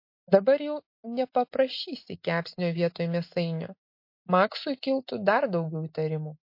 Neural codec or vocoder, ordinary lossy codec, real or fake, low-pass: none; MP3, 32 kbps; real; 5.4 kHz